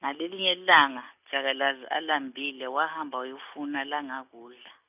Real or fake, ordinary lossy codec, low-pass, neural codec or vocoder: real; none; 3.6 kHz; none